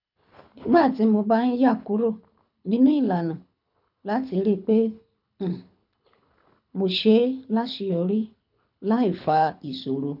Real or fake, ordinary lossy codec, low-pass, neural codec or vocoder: fake; none; 5.4 kHz; codec, 24 kHz, 6 kbps, HILCodec